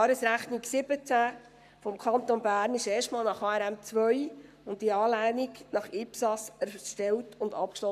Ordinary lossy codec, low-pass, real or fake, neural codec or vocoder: none; 14.4 kHz; fake; codec, 44.1 kHz, 7.8 kbps, Pupu-Codec